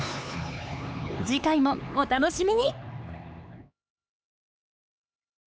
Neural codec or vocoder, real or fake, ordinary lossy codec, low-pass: codec, 16 kHz, 4 kbps, X-Codec, HuBERT features, trained on LibriSpeech; fake; none; none